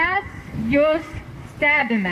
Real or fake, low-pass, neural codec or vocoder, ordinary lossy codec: fake; 14.4 kHz; vocoder, 44.1 kHz, 128 mel bands, Pupu-Vocoder; AAC, 64 kbps